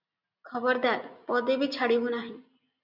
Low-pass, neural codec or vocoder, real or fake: 5.4 kHz; none; real